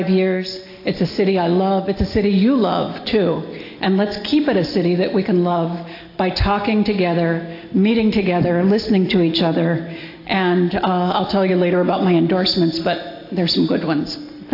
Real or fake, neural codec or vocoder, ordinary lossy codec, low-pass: real; none; AAC, 32 kbps; 5.4 kHz